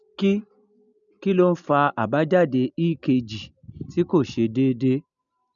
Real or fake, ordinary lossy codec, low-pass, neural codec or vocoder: real; none; 7.2 kHz; none